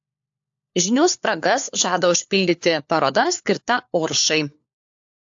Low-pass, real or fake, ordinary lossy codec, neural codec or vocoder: 7.2 kHz; fake; AAC, 64 kbps; codec, 16 kHz, 4 kbps, FunCodec, trained on LibriTTS, 50 frames a second